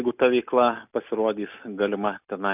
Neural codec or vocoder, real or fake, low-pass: none; real; 3.6 kHz